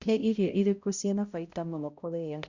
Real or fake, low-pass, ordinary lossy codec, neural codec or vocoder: fake; 7.2 kHz; Opus, 64 kbps; codec, 16 kHz, 0.5 kbps, X-Codec, HuBERT features, trained on balanced general audio